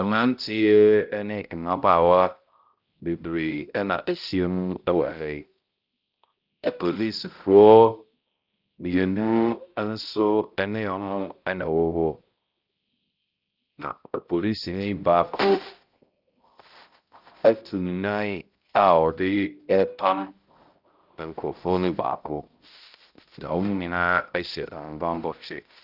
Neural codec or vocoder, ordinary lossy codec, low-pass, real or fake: codec, 16 kHz, 0.5 kbps, X-Codec, HuBERT features, trained on balanced general audio; Opus, 24 kbps; 5.4 kHz; fake